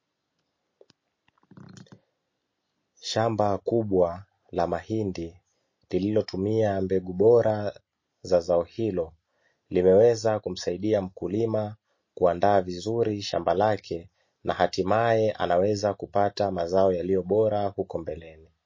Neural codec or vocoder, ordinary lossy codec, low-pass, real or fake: none; MP3, 32 kbps; 7.2 kHz; real